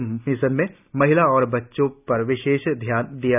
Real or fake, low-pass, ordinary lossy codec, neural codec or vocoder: real; 3.6 kHz; none; none